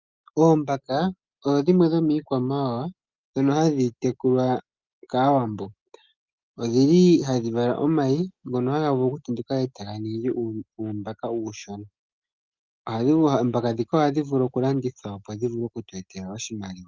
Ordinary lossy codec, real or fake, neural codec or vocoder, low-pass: Opus, 32 kbps; real; none; 7.2 kHz